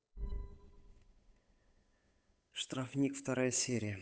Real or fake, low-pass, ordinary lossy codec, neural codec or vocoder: fake; none; none; codec, 16 kHz, 8 kbps, FunCodec, trained on Chinese and English, 25 frames a second